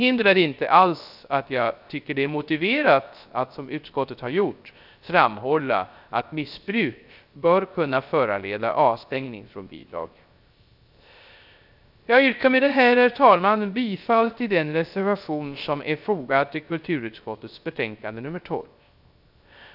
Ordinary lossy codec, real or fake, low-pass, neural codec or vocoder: none; fake; 5.4 kHz; codec, 16 kHz, 0.3 kbps, FocalCodec